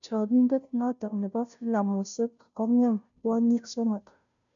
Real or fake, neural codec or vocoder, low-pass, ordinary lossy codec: fake; codec, 16 kHz, 0.5 kbps, FunCodec, trained on Chinese and English, 25 frames a second; 7.2 kHz; none